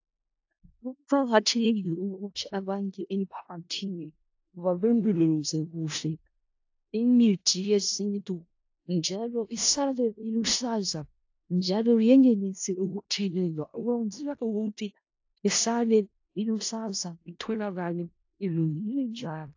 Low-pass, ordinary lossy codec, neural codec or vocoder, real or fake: 7.2 kHz; AAC, 48 kbps; codec, 16 kHz in and 24 kHz out, 0.4 kbps, LongCat-Audio-Codec, four codebook decoder; fake